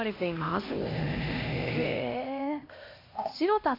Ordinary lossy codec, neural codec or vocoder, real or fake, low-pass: MP3, 32 kbps; codec, 16 kHz, 1 kbps, X-Codec, HuBERT features, trained on LibriSpeech; fake; 5.4 kHz